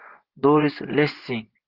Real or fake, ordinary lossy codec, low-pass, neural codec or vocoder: fake; Opus, 16 kbps; 5.4 kHz; vocoder, 24 kHz, 100 mel bands, Vocos